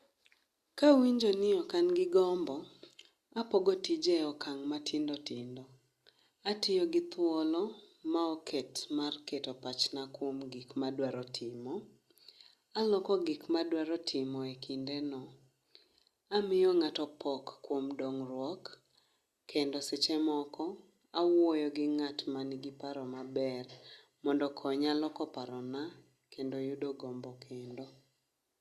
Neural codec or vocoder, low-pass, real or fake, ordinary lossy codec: none; 14.4 kHz; real; Opus, 64 kbps